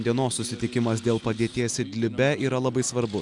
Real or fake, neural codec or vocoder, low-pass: real; none; 10.8 kHz